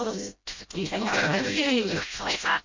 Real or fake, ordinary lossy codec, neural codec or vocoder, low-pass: fake; MP3, 48 kbps; codec, 16 kHz, 0.5 kbps, FreqCodec, smaller model; 7.2 kHz